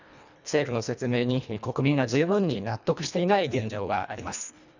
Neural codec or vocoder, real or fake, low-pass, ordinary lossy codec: codec, 24 kHz, 1.5 kbps, HILCodec; fake; 7.2 kHz; none